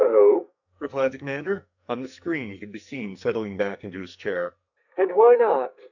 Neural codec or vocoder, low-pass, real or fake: codec, 44.1 kHz, 2.6 kbps, SNAC; 7.2 kHz; fake